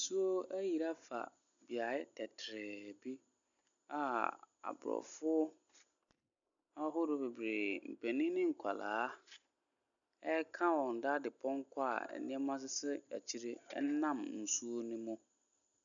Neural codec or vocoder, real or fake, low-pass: none; real; 7.2 kHz